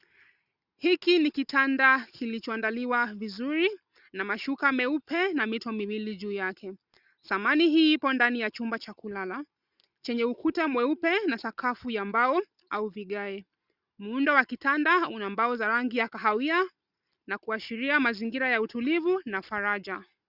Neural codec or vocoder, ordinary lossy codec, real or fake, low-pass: none; Opus, 64 kbps; real; 5.4 kHz